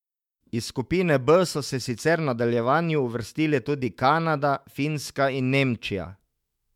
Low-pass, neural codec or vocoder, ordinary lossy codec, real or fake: 19.8 kHz; autoencoder, 48 kHz, 128 numbers a frame, DAC-VAE, trained on Japanese speech; MP3, 96 kbps; fake